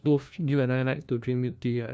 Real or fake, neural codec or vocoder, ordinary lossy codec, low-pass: fake; codec, 16 kHz, 1 kbps, FunCodec, trained on LibriTTS, 50 frames a second; none; none